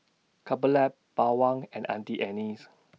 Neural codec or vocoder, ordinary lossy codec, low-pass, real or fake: none; none; none; real